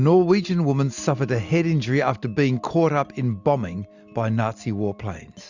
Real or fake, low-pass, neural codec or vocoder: real; 7.2 kHz; none